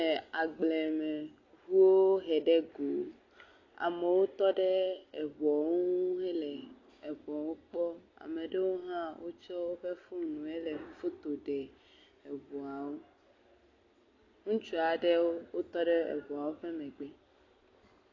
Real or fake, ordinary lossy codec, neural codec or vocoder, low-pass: real; AAC, 32 kbps; none; 7.2 kHz